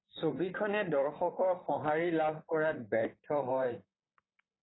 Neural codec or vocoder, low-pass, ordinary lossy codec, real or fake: vocoder, 44.1 kHz, 128 mel bands, Pupu-Vocoder; 7.2 kHz; AAC, 16 kbps; fake